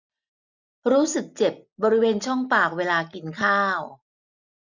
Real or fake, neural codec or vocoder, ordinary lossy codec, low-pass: real; none; none; 7.2 kHz